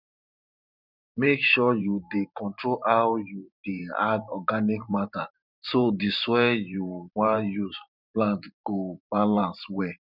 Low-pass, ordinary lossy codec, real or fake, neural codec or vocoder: 5.4 kHz; none; fake; vocoder, 44.1 kHz, 128 mel bands every 512 samples, BigVGAN v2